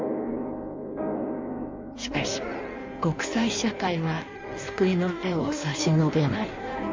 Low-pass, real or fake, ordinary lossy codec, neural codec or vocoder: 7.2 kHz; fake; MP3, 64 kbps; codec, 16 kHz in and 24 kHz out, 1.1 kbps, FireRedTTS-2 codec